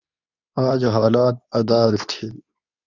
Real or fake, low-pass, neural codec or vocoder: fake; 7.2 kHz; codec, 24 kHz, 0.9 kbps, WavTokenizer, medium speech release version 2